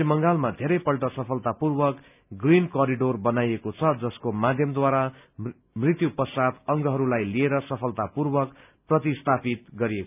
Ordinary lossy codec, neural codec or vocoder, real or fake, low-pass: none; none; real; 3.6 kHz